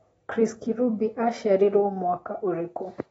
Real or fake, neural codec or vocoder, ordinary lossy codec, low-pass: real; none; AAC, 24 kbps; 19.8 kHz